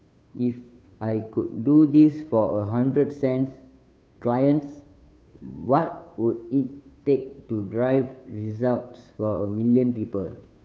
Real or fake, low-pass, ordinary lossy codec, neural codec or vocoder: fake; none; none; codec, 16 kHz, 2 kbps, FunCodec, trained on Chinese and English, 25 frames a second